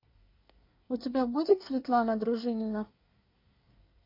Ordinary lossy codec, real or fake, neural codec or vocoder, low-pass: MP3, 32 kbps; fake; codec, 24 kHz, 1 kbps, SNAC; 5.4 kHz